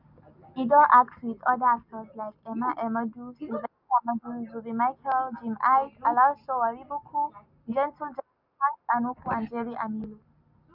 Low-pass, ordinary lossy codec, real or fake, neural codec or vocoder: 5.4 kHz; none; real; none